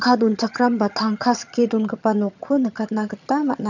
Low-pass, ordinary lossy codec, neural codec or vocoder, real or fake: 7.2 kHz; none; vocoder, 22.05 kHz, 80 mel bands, HiFi-GAN; fake